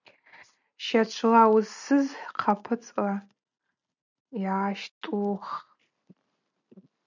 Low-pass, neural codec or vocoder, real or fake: 7.2 kHz; none; real